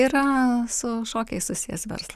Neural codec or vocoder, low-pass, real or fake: none; 14.4 kHz; real